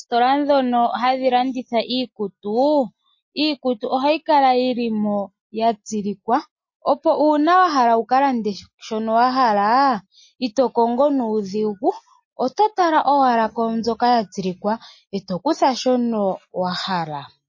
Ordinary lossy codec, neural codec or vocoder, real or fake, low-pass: MP3, 32 kbps; none; real; 7.2 kHz